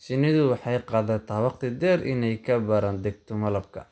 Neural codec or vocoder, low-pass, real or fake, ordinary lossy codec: none; none; real; none